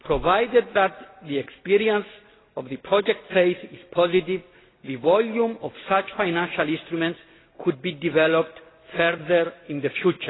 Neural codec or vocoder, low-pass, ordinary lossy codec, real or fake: none; 7.2 kHz; AAC, 16 kbps; real